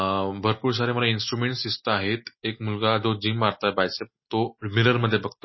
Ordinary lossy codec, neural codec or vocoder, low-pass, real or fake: MP3, 24 kbps; none; 7.2 kHz; real